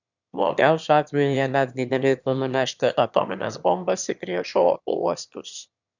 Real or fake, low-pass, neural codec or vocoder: fake; 7.2 kHz; autoencoder, 22.05 kHz, a latent of 192 numbers a frame, VITS, trained on one speaker